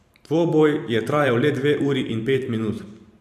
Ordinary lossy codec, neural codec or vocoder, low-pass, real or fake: AAC, 96 kbps; vocoder, 44.1 kHz, 128 mel bands every 512 samples, BigVGAN v2; 14.4 kHz; fake